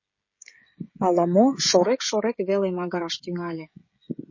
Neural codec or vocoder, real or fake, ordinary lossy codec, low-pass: codec, 16 kHz, 16 kbps, FreqCodec, smaller model; fake; MP3, 32 kbps; 7.2 kHz